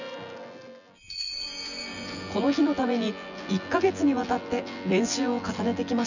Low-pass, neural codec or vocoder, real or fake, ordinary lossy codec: 7.2 kHz; vocoder, 24 kHz, 100 mel bands, Vocos; fake; none